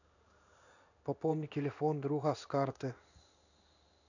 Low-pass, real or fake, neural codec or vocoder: 7.2 kHz; fake; codec, 16 kHz in and 24 kHz out, 1 kbps, XY-Tokenizer